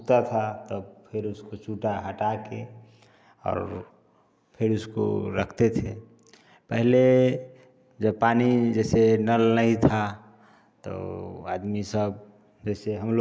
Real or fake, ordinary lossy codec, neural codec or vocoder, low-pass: real; none; none; none